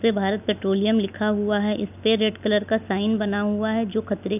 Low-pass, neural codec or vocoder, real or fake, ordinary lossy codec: 3.6 kHz; none; real; none